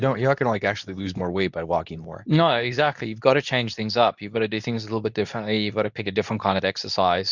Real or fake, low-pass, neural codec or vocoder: fake; 7.2 kHz; codec, 24 kHz, 0.9 kbps, WavTokenizer, medium speech release version 2